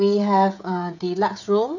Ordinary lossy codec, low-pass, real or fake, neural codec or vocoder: none; 7.2 kHz; fake; codec, 16 kHz, 16 kbps, FreqCodec, smaller model